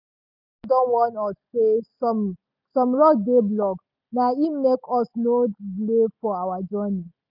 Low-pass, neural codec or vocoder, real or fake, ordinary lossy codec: 5.4 kHz; none; real; none